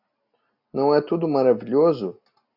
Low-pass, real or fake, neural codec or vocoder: 5.4 kHz; real; none